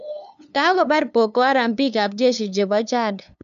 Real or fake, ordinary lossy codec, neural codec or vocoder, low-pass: fake; none; codec, 16 kHz, 2 kbps, FunCodec, trained on LibriTTS, 25 frames a second; 7.2 kHz